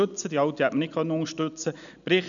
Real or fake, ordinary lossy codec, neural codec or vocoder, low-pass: real; none; none; 7.2 kHz